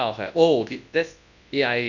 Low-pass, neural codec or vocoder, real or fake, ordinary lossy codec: 7.2 kHz; codec, 24 kHz, 0.9 kbps, WavTokenizer, large speech release; fake; none